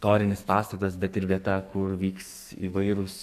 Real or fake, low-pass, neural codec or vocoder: fake; 14.4 kHz; codec, 44.1 kHz, 2.6 kbps, SNAC